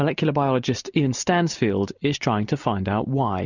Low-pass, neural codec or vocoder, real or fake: 7.2 kHz; none; real